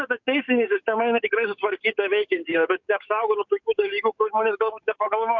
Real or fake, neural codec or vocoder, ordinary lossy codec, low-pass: fake; codec, 44.1 kHz, 7.8 kbps, DAC; MP3, 64 kbps; 7.2 kHz